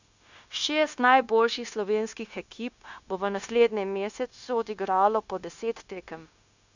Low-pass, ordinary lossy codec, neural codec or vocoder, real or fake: 7.2 kHz; none; codec, 16 kHz, 0.9 kbps, LongCat-Audio-Codec; fake